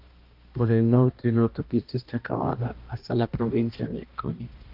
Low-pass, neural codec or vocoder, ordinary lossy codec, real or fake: 5.4 kHz; codec, 16 kHz, 1 kbps, X-Codec, HuBERT features, trained on balanced general audio; Opus, 32 kbps; fake